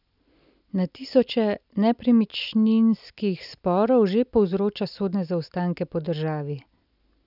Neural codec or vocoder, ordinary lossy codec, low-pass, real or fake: none; none; 5.4 kHz; real